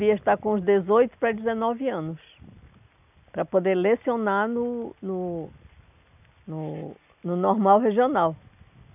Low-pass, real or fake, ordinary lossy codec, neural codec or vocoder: 3.6 kHz; real; none; none